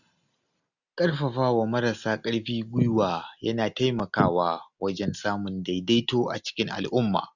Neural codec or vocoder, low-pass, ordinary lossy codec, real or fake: none; 7.2 kHz; none; real